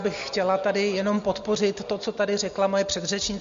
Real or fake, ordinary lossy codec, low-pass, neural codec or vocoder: real; MP3, 48 kbps; 7.2 kHz; none